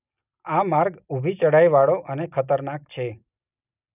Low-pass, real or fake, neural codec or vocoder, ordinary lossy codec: 3.6 kHz; real; none; none